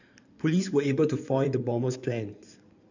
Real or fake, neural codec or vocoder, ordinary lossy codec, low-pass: fake; codec, 16 kHz in and 24 kHz out, 2.2 kbps, FireRedTTS-2 codec; none; 7.2 kHz